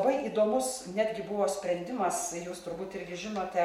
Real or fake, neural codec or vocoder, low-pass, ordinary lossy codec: real; none; 19.8 kHz; MP3, 64 kbps